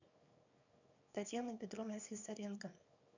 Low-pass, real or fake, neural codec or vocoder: 7.2 kHz; fake; codec, 24 kHz, 0.9 kbps, WavTokenizer, small release